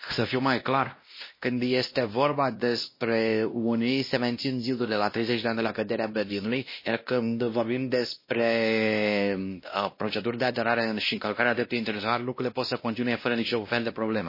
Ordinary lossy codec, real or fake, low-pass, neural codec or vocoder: MP3, 24 kbps; fake; 5.4 kHz; codec, 16 kHz, 1 kbps, X-Codec, WavLM features, trained on Multilingual LibriSpeech